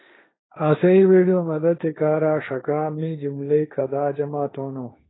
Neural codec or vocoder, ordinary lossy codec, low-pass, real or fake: codec, 16 kHz, 1.1 kbps, Voila-Tokenizer; AAC, 16 kbps; 7.2 kHz; fake